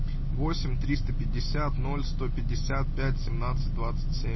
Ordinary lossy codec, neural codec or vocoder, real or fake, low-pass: MP3, 24 kbps; none; real; 7.2 kHz